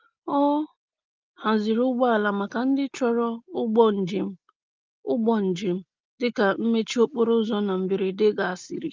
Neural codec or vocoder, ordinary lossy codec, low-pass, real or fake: none; Opus, 32 kbps; 7.2 kHz; real